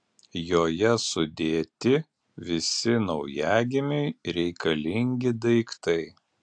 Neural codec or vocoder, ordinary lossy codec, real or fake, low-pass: none; AAC, 64 kbps; real; 9.9 kHz